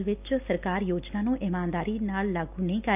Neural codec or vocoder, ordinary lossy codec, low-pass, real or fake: none; none; 3.6 kHz; real